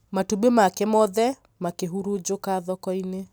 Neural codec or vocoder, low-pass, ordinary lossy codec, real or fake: none; none; none; real